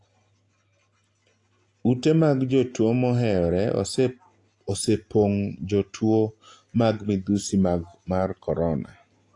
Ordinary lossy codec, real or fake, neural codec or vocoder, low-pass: AAC, 48 kbps; real; none; 10.8 kHz